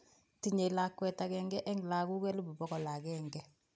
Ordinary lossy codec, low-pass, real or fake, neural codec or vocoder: none; none; real; none